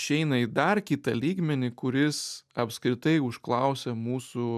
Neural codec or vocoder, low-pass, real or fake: none; 14.4 kHz; real